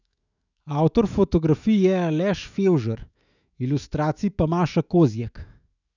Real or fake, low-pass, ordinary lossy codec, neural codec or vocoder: fake; 7.2 kHz; none; autoencoder, 48 kHz, 128 numbers a frame, DAC-VAE, trained on Japanese speech